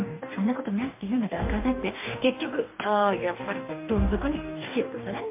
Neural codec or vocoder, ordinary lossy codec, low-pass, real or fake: codec, 44.1 kHz, 2.6 kbps, DAC; MP3, 24 kbps; 3.6 kHz; fake